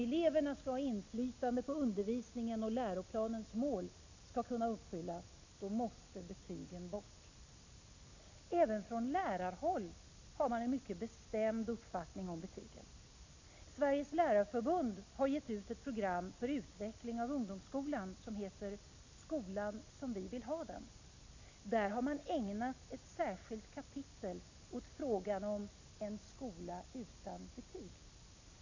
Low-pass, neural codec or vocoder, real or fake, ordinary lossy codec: 7.2 kHz; none; real; AAC, 48 kbps